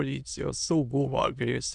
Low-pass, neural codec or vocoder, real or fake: 9.9 kHz; autoencoder, 22.05 kHz, a latent of 192 numbers a frame, VITS, trained on many speakers; fake